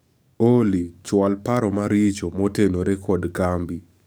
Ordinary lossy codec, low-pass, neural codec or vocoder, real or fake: none; none; codec, 44.1 kHz, 7.8 kbps, DAC; fake